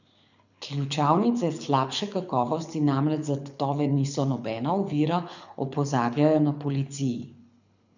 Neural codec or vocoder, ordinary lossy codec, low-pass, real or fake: codec, 24 kHz, 6 kbps, HILCodec; none; 7.2 kHz; fake